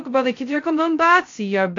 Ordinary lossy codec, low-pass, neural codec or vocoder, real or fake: AAC, 48 kbps; 7.2 kHz; codec, 16 kHz, 0.2 kbps, FocalCodec; fake